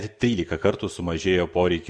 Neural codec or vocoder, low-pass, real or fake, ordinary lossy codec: none; 9.9 kHz; real; Opus, 64 kbps